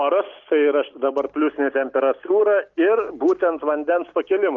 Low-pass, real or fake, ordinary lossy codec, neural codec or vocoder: 7.2 kHz; real; Opus, 24 kbps; none